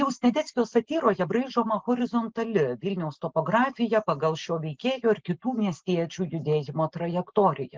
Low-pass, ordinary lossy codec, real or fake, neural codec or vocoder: 7.2 kHz; Opus, 16 kbps; real; none